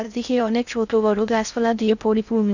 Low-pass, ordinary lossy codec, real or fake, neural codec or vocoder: 7.2 kHz; none; fake; codec, 16 kHz in and 24 kHz out, 0.6 kbps, FocalCodec, streaming, 4096 codes